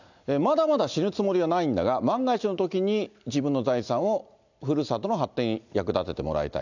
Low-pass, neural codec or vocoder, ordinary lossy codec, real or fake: 7.2 kHz; none; none; real